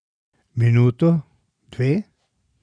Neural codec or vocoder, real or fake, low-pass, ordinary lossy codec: none; real; 9.9 kHz; none